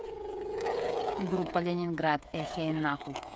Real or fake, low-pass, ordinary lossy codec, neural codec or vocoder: fake; none; none; codec, 16 kHz, 8 kbps, FreqCodec, smaller model